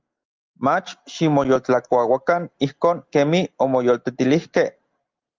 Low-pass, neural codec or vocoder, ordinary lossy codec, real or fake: 7.2 kHz; none; Opus, 32 kbps; real